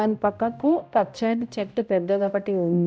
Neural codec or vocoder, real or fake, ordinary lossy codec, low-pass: codec, 16 kHz, 0.5 kbps, X-Codec, HuBERT features, trained on balanced general audio; fake; none; none